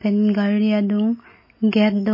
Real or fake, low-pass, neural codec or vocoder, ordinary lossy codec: real; 5.4 kHz; none; MP3, 24 kbps